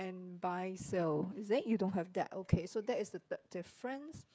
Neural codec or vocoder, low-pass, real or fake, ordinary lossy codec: codec, 16 kHz, 16 kbps, FreqCodec, smaller model; none; fake; none